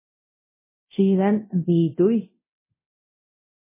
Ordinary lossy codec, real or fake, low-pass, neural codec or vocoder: MP3, 16 kbps; fake; 3.6 kHz; codec, 24 kHz, 0.9 kbps, DualCodec